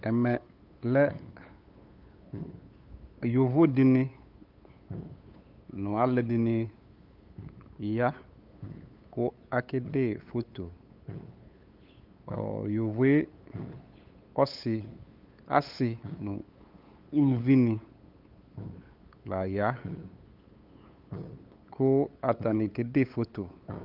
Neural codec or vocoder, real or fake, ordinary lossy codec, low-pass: codec, 16 kHz, 8 kbps, FunCodec, trained on LibriTTS, 25 frames a second; fake; Opus, 24 kbps; 5.4 kHz